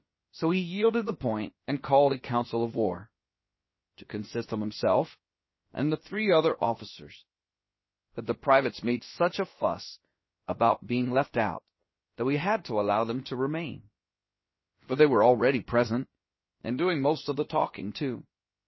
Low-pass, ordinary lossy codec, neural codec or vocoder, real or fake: 7.2 kHz; MP3, 24 kbps; codec, 16 kHz, about 1 kbps, DyCAST, with the encoder's durations; fake